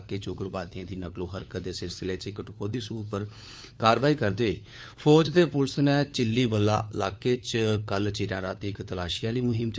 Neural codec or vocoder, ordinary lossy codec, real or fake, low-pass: codec, 16 kHz, 4 kbps, FunCodec, trained on LibriTTS, 50 frames a second; none; fake; none